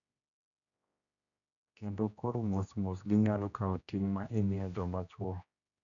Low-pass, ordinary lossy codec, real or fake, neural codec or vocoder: 7.2 kHz; none; fake; codec, 16 kHz, 1 kbps, X-Codec, HuBERT features, trained on general audio